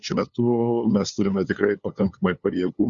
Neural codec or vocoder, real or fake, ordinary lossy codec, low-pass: codec, 16 kHz, 2 kbps, FunCodec, trained on LibriTTS, 25 frames a second; fake; Opus, 64 kbps; 7.2 kHz